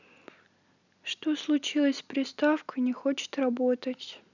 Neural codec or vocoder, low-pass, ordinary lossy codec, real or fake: none; 7.2 kHz; none; real